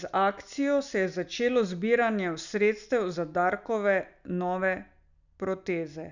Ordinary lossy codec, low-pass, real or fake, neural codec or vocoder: none; 7.2 kHz; real; none